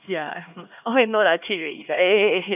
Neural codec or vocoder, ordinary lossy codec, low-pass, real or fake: codec, 16 kHz, 2 kbps, X-Codec, HuBERT features, trained on LibriSpeech; none; 3.6 kHz; fake